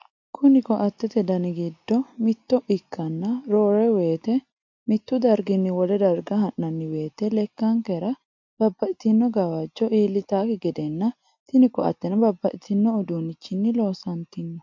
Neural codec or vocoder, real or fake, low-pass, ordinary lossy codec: none; real; 7.2 kHz; MP3, 48 kbps